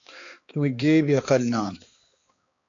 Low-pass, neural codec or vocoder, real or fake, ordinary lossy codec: 7.2 kHz; codec, 16 kHz, 2 kbps, X-Codec, HuBERT features, trained on balanced general audio; fake; AAC, 48 kbps